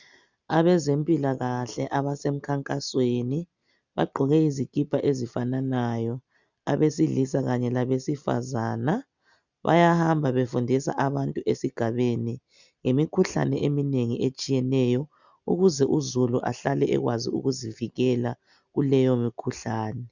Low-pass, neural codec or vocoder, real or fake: 7.2 kHz; none; real